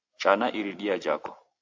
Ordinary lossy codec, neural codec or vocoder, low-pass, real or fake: AAC, 48 kbps; none; 7.2 kHz; real